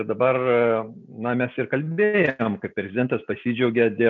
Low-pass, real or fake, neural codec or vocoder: 7.2 kHz; real; none